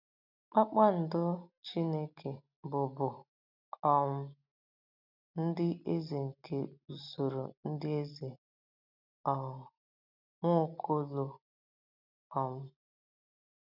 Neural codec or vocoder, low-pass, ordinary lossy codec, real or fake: none; 5.4 kHz; none; real